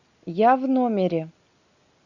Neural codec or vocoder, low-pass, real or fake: none; 7.2 kHz; real